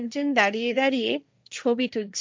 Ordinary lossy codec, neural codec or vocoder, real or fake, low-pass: none; codec, 16 kHz, 1.1 kbps, Voila-Tokenizer; fake; none